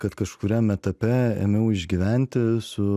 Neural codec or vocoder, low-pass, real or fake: none; 14.4 kHz; real